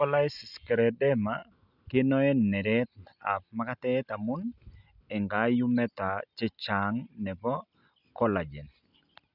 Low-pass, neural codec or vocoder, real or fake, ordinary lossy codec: 5.4 kHz; none; real; none